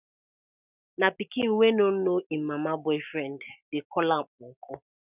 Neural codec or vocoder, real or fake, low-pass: none; real; 3.6 kHz